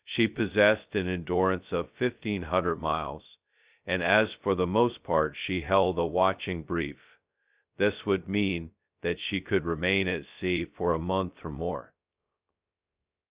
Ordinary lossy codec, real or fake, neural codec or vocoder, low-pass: Opus, 64 kbps; fake; codec, 16 kHz, 0.2 kbps, FocalCodec; 3.6 kHz